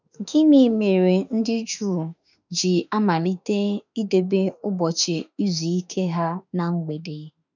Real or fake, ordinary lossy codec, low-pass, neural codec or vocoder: fake; none; 7.2 kHz; autoencoder, 48 kHz, 32 numbers a frame, DAC-VAE, trained on Japanese speech